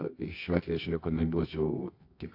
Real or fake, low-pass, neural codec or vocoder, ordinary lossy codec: fake; 5.4 kHz; codec, 24 kHz, 0.9 kbps, WavTokenizer, medium music audio release; AAC, 32 kbps